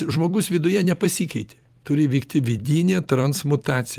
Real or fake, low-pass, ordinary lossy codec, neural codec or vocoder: fake; 14.4 kHz; Opus, 32 kbps; vocoder, 44.1 kHz, 128 mel bands every 256 samples, BigVGAN v2